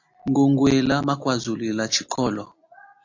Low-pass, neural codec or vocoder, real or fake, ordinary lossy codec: 7.2 kHz; none; real; AAC, 48 kbps